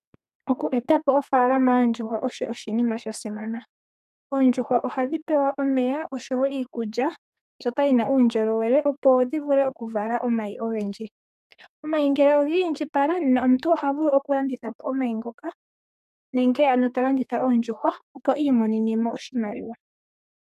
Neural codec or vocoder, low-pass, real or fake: codec, 32 kHz, 1.9 kbps, SNAC; 14.4 kHz; fake